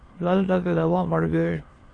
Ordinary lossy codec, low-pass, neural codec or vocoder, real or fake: AAC, 32 kbps; 9.9 kHz; autoencoder, 22.05 kHz, a latent of 192 numbers a frame, VITS, trained on many speakers; fake